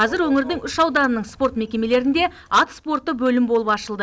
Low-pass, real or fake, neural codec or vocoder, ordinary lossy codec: none; real; none; none